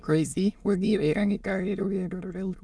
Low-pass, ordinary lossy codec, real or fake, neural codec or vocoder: none; none; fake; autoencoder, 22.05 kHz, a latent of 192 numbers a frame, VITS, trained on many speakers